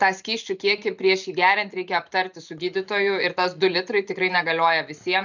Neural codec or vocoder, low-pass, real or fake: none; 7.2 kHz; real